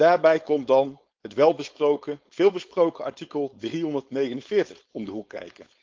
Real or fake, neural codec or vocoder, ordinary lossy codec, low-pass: fake; codec, 16 kHz, 4.8 kbps, FACodec; Opus, 24 kbps; 7.2 kHz